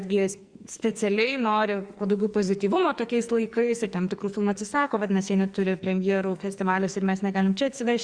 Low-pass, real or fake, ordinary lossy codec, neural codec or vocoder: 9.9 kHz; fake; Opus, 64 kbps; codec, 44.1 kHz, 2.6 kbps, SNAC